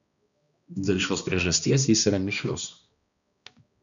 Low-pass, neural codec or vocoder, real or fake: 7.2 kHz; codec, 16 kHz, 1 kbps, X-Codec, HuBERT features, trained on balanced general audio; fake